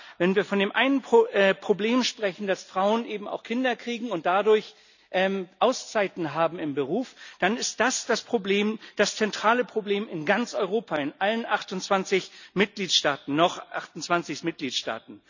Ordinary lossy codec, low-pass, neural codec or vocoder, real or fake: none; 7.2 kHz; none; real